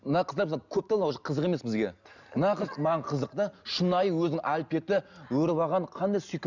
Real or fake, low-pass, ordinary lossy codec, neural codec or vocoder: real; 7.2 kHz; none; none